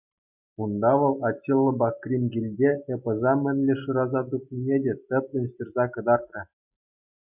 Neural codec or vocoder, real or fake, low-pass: none; real; 3.6 kHz